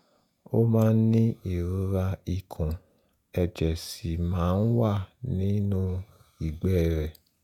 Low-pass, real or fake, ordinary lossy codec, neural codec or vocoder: 19.8 kHz; fake; none; vocoder, 48 kHz, 128 mel bands, Vocos